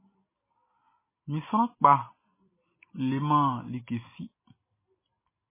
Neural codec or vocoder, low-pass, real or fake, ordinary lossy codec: none; 3.6 kHz; real; MP3, 16 kbps